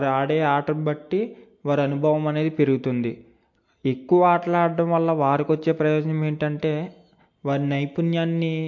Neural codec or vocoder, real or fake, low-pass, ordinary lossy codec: none; real; 7.2 kHz; MP3, 48 kbps